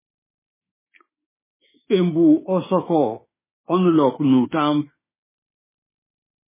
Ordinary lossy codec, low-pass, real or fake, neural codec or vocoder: MP3, 16 kbps; 3.6 kHz; fake; autoencoder, 48 kHz, 32 numbers a frame, DAC-VAE, trained on Japanese speech